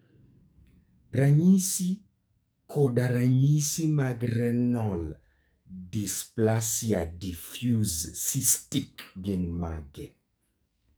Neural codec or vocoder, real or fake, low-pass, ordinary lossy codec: codec, 44.1 kHz, 2.6 kbps, SNAC; fake; none; none